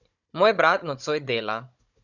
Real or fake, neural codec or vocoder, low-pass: fake; codec, 16 kHz, 16 kbps, FunCodec, trained on Chinese and English, 50 frames a second; 7.2 kHz